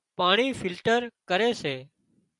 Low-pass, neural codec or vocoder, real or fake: 10.8 kHz; vocoder, 44.1 kHz, 128 mel bands every 256 samples, BigVGAN v2; fake